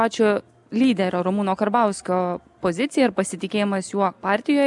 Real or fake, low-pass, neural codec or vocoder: real; 10.8 kHz; none